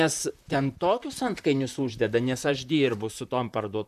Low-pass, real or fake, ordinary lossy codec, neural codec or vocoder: 14.4 kHz; fake; MP3, 96 kbps; vocoder, 44.1 kHz, 128 mel bands, Pupu-Vocoder